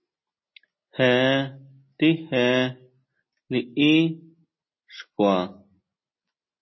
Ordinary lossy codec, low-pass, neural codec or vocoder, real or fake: MP3, 24 kbps; 7.2 kHz; none; real